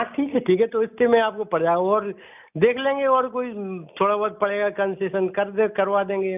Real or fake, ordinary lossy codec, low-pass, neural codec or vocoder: real; none; 3.6 kHz; none